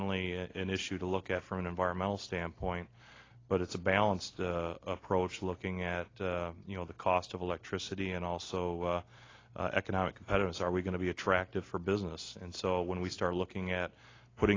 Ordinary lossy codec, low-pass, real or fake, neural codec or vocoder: AAC, 32 kbps; 7.2 kHz; real; none